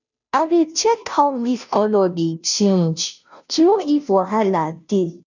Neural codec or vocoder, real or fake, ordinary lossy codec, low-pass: codec, 16 kHz, 0.5 kbps, FunCodec, trained on Chinese and English, 25 frames a second; fake; none; 7.2 kHz